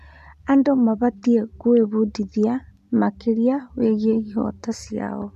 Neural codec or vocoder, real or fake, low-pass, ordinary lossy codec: none; real; 14.4 kHz; none